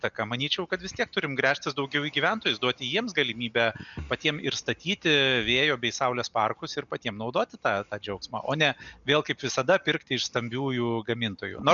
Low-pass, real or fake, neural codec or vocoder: 7.2 kHz; real; none